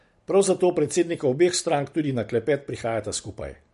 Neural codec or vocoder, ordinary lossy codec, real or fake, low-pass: none; MP3, 48 kbps; real; 14.4 kHz